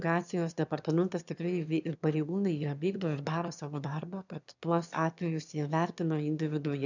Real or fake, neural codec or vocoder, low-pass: fake; autoencoder, 22.05 kHz, a latent of 192 numbers a frame, VITS, trained on one speaker; 7.2 kHz